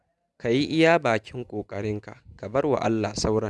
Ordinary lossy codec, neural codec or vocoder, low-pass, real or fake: none; none; none; real